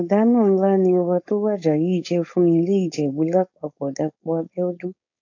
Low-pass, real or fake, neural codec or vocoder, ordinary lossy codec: 7.2 kHz; fake; codec, 16 kHz, 4.8 kbps, FACodec; AAC, 48 kbps